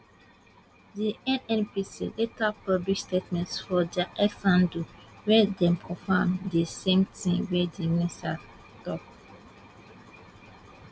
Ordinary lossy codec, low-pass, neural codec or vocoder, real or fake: none; none; none; real